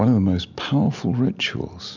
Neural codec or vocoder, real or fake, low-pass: none; real; 7.2 kHz